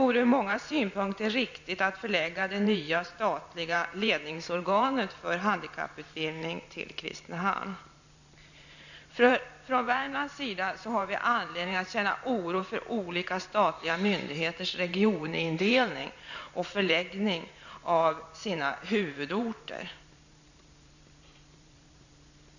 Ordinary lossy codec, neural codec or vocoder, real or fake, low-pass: none; vocoder, 44.1 kHz, 128 mel bands every 512 samples, BigVGAN v2; fake; 7.2 kHz